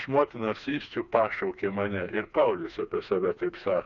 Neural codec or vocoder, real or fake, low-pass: codec, 16 kHz, 2 kbps, FreqCodec, smaller model; fake; 7.2 kHz